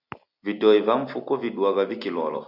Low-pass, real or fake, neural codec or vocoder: 5.4 kHz; real; none